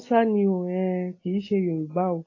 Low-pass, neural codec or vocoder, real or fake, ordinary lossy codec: 7.2 kHz; none; real; AAC, 32 kbps